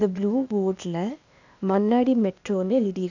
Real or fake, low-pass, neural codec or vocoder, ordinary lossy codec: fake; 7.2 kHz; codec, 16 kHz, 0.8 kbps, ZipCodec; none